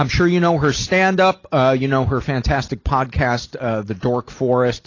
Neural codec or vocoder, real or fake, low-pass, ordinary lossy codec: none; real; 7.2 kHz; AAC, 32 kbps